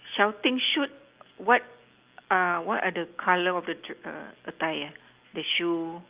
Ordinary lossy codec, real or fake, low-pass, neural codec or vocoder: Opus, 32 kbps; real; 3.6 kHz; none